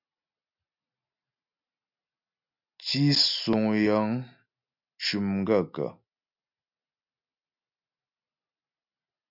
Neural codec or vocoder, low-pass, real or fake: none; 5.4 kHz; real